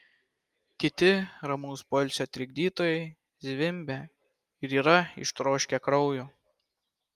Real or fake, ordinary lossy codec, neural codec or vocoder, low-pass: real; Opus, 32 kbps; none; 14.4 kHz